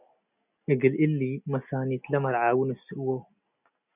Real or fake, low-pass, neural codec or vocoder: real; 3.6 kHz; none